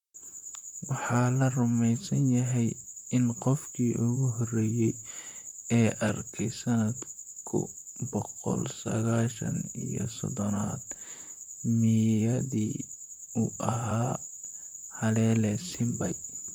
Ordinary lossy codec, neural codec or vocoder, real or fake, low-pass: MP3, 96 kbps; vocoder, 44.1 kHz, 128 mel bands, Pupu-Vocoder; fake; 19.8 kHz